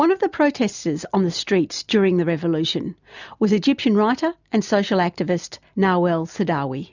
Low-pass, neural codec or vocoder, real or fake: 7.2 kHz; none; real